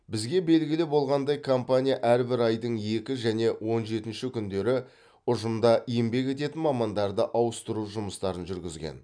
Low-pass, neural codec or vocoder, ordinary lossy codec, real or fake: 9.9 kHz; none; none; real